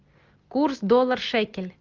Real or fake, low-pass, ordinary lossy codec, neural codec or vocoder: real; 7.2 kHz; Opus, 24 kbps; none